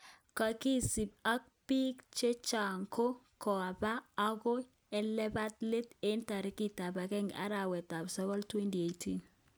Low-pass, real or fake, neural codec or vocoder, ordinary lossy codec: none; real; none; none